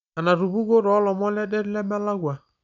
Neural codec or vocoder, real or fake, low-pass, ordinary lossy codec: none; real; 7.2 kHz; none